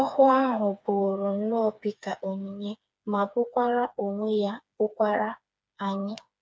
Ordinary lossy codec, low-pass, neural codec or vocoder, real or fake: none; none; codec, 16 kHz, 4 kbps, FreqCodec, smaller model; fake